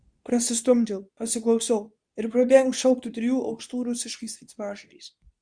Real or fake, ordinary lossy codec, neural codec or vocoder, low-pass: fake; Opus, 64 kbps; codec, 24 kHz, 0.9 kbps, WavTokenizer, medium speech release version 2; 9.9 kHz